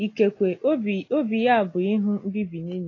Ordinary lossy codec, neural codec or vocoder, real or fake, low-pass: AAC, 32 kbps; none; real; 7.2 kHz